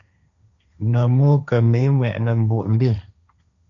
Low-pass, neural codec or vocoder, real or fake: 7.2 kHz; codec, 16 kHz, 1.1 kbps, Voila-Tokenizer; fake